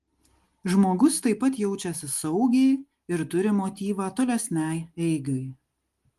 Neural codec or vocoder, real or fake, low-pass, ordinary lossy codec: none; real; 14.4 kHz; Opus, 24 kbps